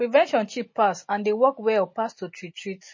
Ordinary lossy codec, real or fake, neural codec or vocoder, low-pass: MP3, 32 kbps; real; none; 7.2 kHz